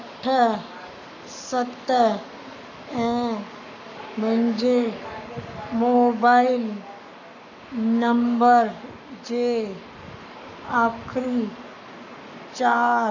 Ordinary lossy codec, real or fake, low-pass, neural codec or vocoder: none; fake; 7.2 kHz; codec, 44.1 kHz, 7.8 kbps, Pupu-Codec